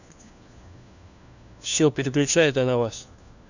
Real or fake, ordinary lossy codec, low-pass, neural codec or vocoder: fake; none; 7.2 kHz; codec, 16 kHz, 1 kbps, FunCodec, trained on LibriTTS, 50 frames a second